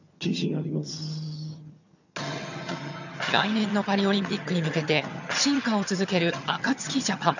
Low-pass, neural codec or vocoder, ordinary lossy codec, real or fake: 7.2 kHz; vocoder, 22.05 kHz, 80 mel bands, HiFi-GAN; none; fake